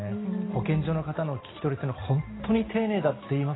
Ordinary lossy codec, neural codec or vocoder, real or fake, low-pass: AAC, 16 kbps; none; real; 7.2 kHz